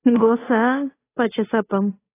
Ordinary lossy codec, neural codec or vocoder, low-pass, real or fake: AAC, 16 kbps; codec, 16 kHz, 8 kbps, FunCodec, trained on Chinese and English, 25 frames a second; 3.6 kHz; fake